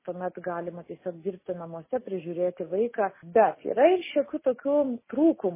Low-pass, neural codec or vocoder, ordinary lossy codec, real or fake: 3.6 kHz; none; MP3, 16 kbps; real